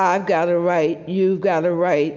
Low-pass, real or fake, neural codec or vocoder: 7.2 kHz; real; none